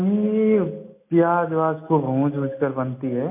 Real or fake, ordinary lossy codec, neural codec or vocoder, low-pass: real; MP3, 24 kbps; none; 3.6 kHz